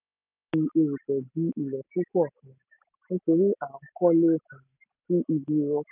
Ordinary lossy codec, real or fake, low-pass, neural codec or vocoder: none; real; 3.6 kHz; none